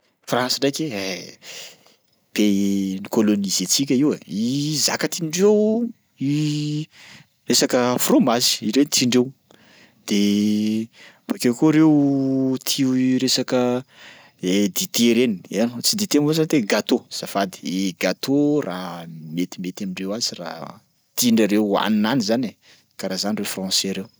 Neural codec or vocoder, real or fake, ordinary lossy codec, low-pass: none; real; none; none